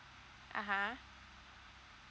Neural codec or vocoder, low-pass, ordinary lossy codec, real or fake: none; none; none; real